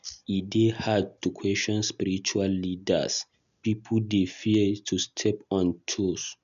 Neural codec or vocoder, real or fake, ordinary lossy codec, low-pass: none; real; none; 7.2 kHz